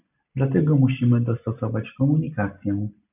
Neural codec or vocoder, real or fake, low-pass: none; real; 3.6 kHz